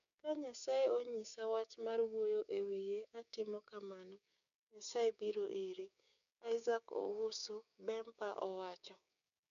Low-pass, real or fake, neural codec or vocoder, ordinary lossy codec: 7.2 kHz; fake; codec, 16 kHz, 6 kbps, DAC; none